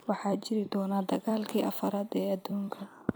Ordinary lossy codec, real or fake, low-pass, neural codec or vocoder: none; fake; none; vocoder, 44.1 kHz, 128 mel bands every 256 samples, BigVGAN v2